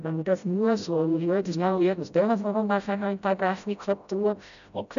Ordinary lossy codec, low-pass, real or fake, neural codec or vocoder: none; 7.2 kHz; fake; codec, 16 kHz, 0.5 kbps, FreqCodec, smaller model